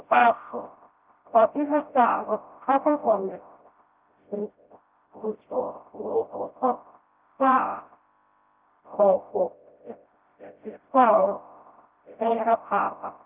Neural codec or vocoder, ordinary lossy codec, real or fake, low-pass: codec, 16 kHz, 0.5 kbps, FreqCodec, smaller model; Opus, 24 kbps; fake; 3.6 kHz